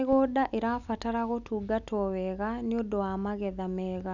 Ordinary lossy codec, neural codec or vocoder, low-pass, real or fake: none; none; 7.2 kHz; real